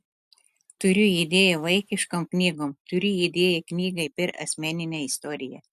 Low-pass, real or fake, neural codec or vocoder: 14.4 kHz; real; none